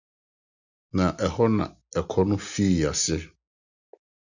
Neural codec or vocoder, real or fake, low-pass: none; real; 7.2 kHz